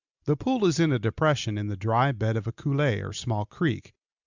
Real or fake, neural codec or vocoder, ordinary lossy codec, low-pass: real; none; Opus, 64 kbps; 7.2 kHz